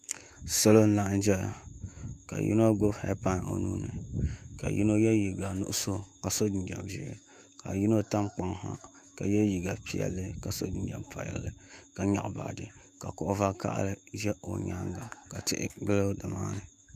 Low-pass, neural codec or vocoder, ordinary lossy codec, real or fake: 14.4 kHz; autoencoder, 48 kHz, 128 numbers a frame, DAC-VAE, trained on Japanese speech; Opus, 64 kbps; fake